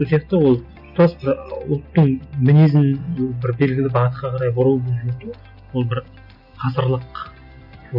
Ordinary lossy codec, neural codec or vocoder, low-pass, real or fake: none; none; 5.4 kHz; real